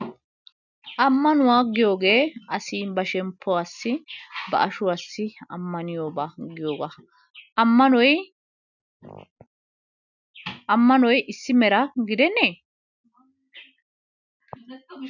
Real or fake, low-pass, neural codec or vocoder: real; 7.2 kHz; none